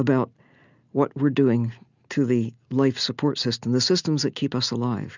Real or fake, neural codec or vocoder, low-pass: real; none; 7.2 kHz